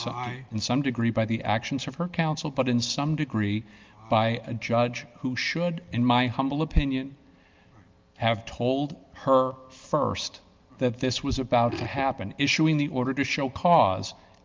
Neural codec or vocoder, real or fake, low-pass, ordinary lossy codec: none; real; 7.2 kHz; Opus, 32 kbps